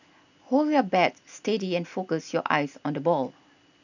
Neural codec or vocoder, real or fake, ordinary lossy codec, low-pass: none; real; none; 7.2 kHz